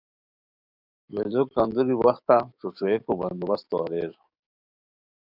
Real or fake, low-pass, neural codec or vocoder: fake; 5.4 kHz; codec, 44.1 kHz, 7.8 kbps, DAC